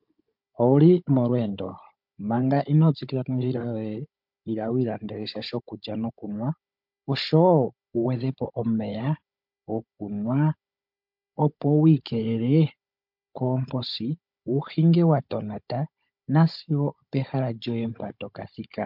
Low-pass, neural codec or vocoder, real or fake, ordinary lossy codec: 5.4 kHz; codec, 16 kHz, 16 kbps, FunCodec, trained on Chinese and English, 50 frames a second; fake; MP3, 48 kbps